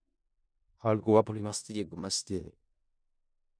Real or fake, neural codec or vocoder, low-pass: fake; codec, 16 kHz in and 24 kHz out, 0.4 kbps, LongCat-Audio-Codec, four codebook decoder; 9.9 kHz